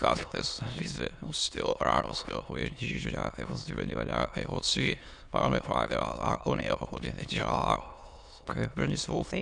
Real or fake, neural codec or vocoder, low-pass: fake; autoencoder, 22.05 kHz, a latent of 192 numbers a frame, VITS, trained on many speakers; 9.9 kHz